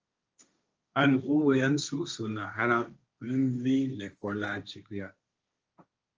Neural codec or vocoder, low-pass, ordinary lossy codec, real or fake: codec, 16 kHz, 1.1 kbps, Voila-Tokenizer; 7.2 kHz; Opus, 24 kbps; fake